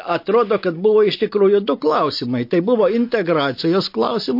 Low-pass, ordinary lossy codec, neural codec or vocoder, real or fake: 5.4 kHz; MP3, 32 kbps; none; real